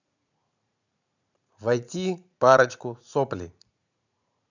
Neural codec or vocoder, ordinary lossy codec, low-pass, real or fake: vocoder, 44.1 kHz, 80 mel bands, Vocos; none; 7.2 kHz; fake